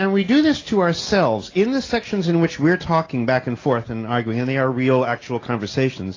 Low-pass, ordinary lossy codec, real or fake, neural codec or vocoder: 7.2 kHz; AAC, 32 kbps; real; none